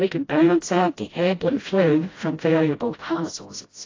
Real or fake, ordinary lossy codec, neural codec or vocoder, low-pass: fake; AAC, 32 kbps; codec, 16 kHz, 0.5 kbps, FreqCodec, smaller model; 7.2 kHz